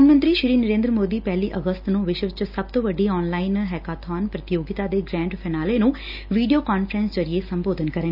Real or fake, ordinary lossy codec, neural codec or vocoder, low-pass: real; none; none; 5.4 kHz